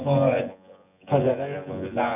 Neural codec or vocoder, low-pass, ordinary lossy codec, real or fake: vocoder, 24 kHz, 100 mel bands, Vocos; 3.6 kHz; none; fake